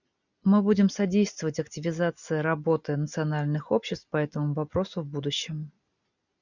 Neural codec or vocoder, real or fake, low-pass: none; real; 7.2 kHz